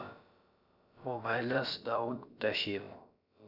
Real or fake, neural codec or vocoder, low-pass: fake; codec, 16 kHz, about 1 kbps, DyCAST, with the encoder's durations; 5.4 kHz